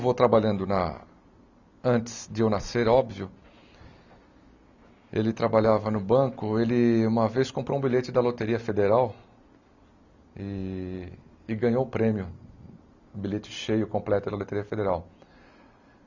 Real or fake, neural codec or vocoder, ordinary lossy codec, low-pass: real; none; none; 7.2 kHz